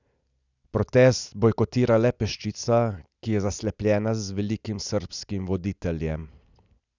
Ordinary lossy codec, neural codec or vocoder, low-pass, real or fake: none; none; 7.2 kHz; real